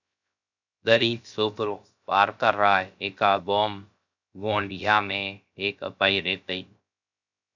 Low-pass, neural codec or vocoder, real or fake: 7.2 kHz; codec, 16 kHz, 0.3 kbps, FocalCodec; fake